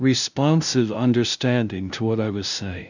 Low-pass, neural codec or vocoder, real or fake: 7.2 kHz; codec, 16 kHz, 0.5 kbps, FunCodec, trained on LibriTTS, 25 frames a second; fake